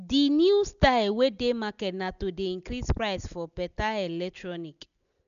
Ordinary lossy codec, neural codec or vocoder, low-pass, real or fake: none; none; 7.2 kHz; real